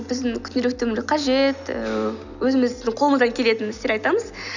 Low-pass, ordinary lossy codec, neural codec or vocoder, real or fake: 7.2 kHz; none; none; real